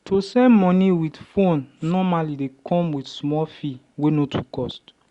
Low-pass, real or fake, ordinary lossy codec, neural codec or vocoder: 10.8 kHz; real; Opus, 64 kbps; none